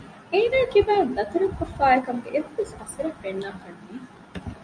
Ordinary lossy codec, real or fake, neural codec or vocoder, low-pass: MP3, 96 kbps; real; none; 9.9 kHz